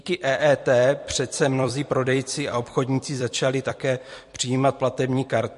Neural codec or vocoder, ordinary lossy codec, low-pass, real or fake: vocoder, 44.1 kHz, 128 mel bands every 256 samples, BigVGAN v2; MP3, 48 kbps; 14.4 kHz; fake